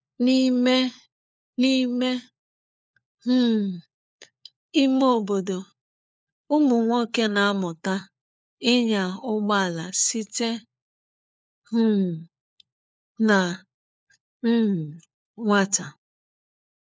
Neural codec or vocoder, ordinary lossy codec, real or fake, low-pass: codec, 16 kHz, 4 kbps, FunCodec, trained on LibriTTS, 50 frames a second; none; fake; none